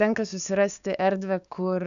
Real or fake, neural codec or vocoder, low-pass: fake; codec, 16 kHz, 6 kbps, DAC; 7.2 kHz